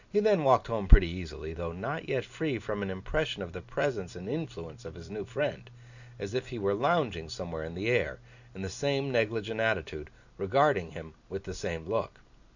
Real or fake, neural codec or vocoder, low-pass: real; none; 7.2 kHz